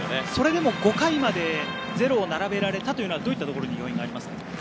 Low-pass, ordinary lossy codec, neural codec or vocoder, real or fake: none; none; none; real